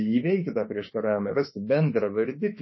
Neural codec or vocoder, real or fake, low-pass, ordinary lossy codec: autoencoder, 48 kHz, 32 numbers a frame, DAC-VAE, trained on Japanese speech; fake; 7.2 kHz; MP3, 24 kbps